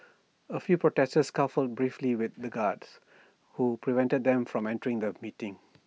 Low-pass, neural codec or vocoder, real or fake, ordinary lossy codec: none; none; real; none